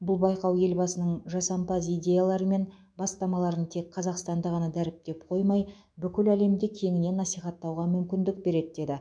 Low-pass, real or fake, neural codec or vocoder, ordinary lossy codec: 9.9 kHz; fake; autoencoder, 48 kHz, 128 numbers a frame, DAC-VAE, trained on Japanese speech; none